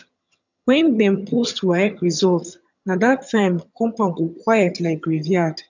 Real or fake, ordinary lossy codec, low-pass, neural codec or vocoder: fake; none; 7.2 kHz; vocoder, 22.05 kHz, 80 mel bands, HiFi-GAN